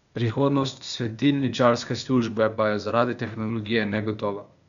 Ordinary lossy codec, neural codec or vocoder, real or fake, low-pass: none; codec, 16 kHz, 0.8 kbps, ZipCodec; fake; 7.2 kHz